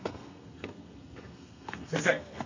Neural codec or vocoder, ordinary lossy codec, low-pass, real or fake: codec, 32 kHz, 1.9 kbps, SNAC; AAC, 48 kbps; 7.2 kHz; fake